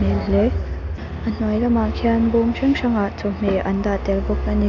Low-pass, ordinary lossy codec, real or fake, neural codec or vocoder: 7.2 kHz; none; real; none